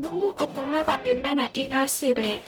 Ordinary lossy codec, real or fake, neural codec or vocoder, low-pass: none; fake; codec, 44.1 kHz, 0.9 kbps, DAC; none